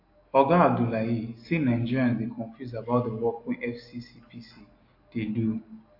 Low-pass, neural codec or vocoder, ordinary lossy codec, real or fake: 5.4 kHz; none; AAC, 32 kbps; real